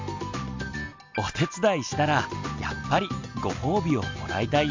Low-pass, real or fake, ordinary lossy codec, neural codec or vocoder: 7.2 kHz; real; MP3, 64 kbps; none